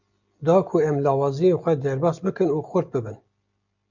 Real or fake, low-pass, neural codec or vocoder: real; 7.2 kHz; none